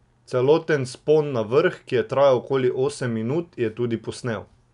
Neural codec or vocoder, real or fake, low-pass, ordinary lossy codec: none; real; 10.8 kHz; none